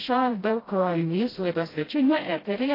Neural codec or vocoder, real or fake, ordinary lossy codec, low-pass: codec, 16 kHz, 0.5 kbps, FreqCodec, smaller model; fake; AAC, 24 kbps; 5.4 kHz